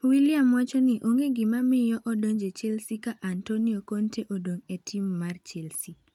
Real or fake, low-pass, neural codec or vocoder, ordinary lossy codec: real; 19.8 kHz; none; none